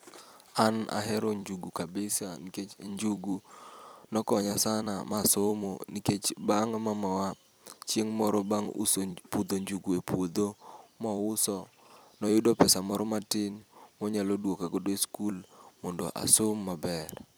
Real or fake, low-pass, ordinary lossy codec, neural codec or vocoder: fake; none; none; vocoder, 44.1 kHz, 128 mel bands every 256 samples, BigVGAN v2